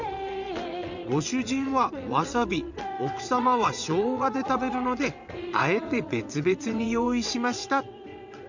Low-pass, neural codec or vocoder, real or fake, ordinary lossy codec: 7.2 kHz; vocoder, 22.05 kHz, 80 mel bands, WaveNeXt; fake; none